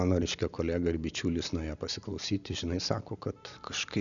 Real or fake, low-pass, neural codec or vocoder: real; 7.2 kHz; none